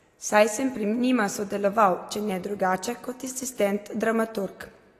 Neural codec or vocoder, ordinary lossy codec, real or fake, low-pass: none; AAC, 48 kbps; real; 14.4 kHz